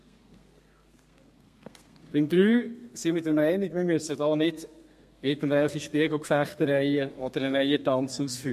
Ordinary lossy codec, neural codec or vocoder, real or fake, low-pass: MP3, 64 kbps; codec, 32 kHz, 1.9 kbps, SNAC; fake; 14.4 kHz